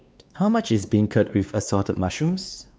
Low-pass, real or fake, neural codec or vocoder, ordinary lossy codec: none; fake; codec, 16 kHz, 2 kbps, X-Codec, WavLM features, trained on Multilingual LibriSpeech; none